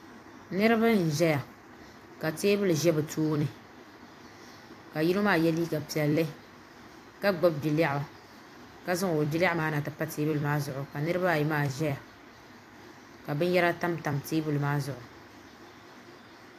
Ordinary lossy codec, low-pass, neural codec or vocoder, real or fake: AAC, 64 kbps; 14.4 kHz; vocoder, 44.1 kHz, 128 mel bands every 256 samples, BigVGAN v2; fake